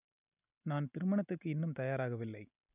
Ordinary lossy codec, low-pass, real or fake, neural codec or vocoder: none; 3.6 kHz; real; none